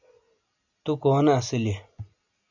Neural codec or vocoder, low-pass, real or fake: none; 7.2 kHz; real